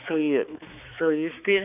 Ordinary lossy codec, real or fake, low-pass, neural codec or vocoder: none; fake; 3.6 kHz; codec, 16 kHz, 4 kbps, X-Codec, HuBERT features, trained on balanced general audio